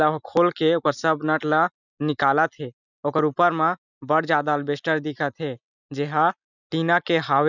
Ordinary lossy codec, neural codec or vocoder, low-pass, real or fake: none; none; 7.2 kHz; real